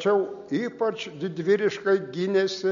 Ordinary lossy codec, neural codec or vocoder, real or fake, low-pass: MP3, 48 kbps; none; real; 7.2 kHz